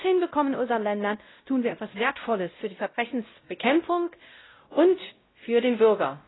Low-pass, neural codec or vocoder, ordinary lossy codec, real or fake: 7.2 kHz; codec, 16 kHz, 0.5 kbps, X-Codec, WavLM features, trained on Multilingual LibriSpeech; AAC, 16 kbps; fake